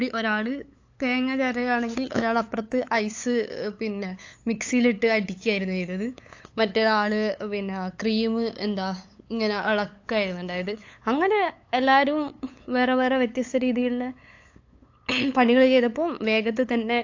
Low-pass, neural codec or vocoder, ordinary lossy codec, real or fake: 7.2 kHz; codec, 16 kHz, 8 kbps, FunCodec, trained on LibriTTS, 25 frames a second; AAC, 48 kbps; fake